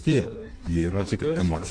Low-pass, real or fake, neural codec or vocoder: 9.9 kHz; fake; codec, 16 kHz in and 24 kHz out, 1.1 kbps, FireRedTTS-2 codec